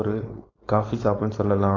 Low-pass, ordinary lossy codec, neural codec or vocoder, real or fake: 7.2 kHz; AAC, 32 kbps; codec, 16 kHz, 4.8 kbps, FACodec; fake